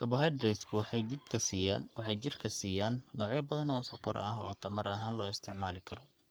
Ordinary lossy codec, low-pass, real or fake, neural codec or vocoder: none; none; fake; codec, 44.1 kHz, 3.4 kbps, Pupu-Codec